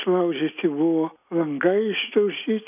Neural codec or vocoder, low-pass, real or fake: none; 3.6 kHz; real